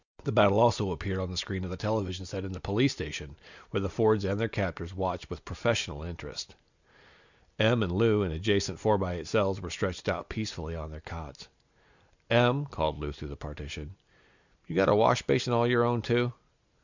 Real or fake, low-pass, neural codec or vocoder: real; 7.2 kHz; none